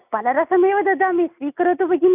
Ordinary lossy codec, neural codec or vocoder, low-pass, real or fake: none; none; 3.6 kHz; real